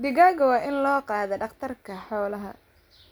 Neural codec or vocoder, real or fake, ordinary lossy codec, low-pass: none; real; none; none